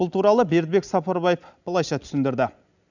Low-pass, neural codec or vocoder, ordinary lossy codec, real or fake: 7.2 kHz; none; none; real